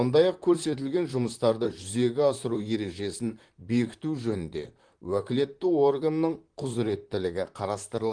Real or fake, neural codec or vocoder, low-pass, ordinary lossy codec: fake; vocoder, 44.1 kHz, 128 mel bands, Pupu-Vocoder; 9.9 kHz; Opus, 24 kbps